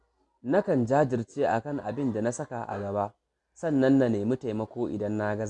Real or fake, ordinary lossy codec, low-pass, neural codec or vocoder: real; none; 10.8 kHz; none